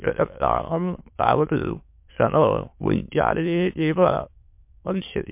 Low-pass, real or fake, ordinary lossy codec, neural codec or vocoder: 3.6 kHz; fake; MP3, 32 kbps; autoencoder, 22.05 kHz, a latent of 192 numbers a frame, VITS, trained on many speakers